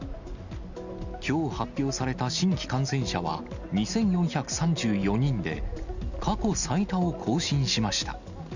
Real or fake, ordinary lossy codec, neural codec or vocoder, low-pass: real; none; none; 7.2 kHz